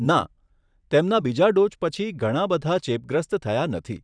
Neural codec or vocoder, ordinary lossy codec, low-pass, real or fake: vocoder, 44.1 kHz, 128 mel bands every 512 samples, BigVGAN v2; none; 9.9 kHz; fake